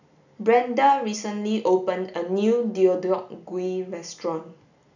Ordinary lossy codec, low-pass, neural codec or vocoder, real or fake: none; 7.2 kHz; none; real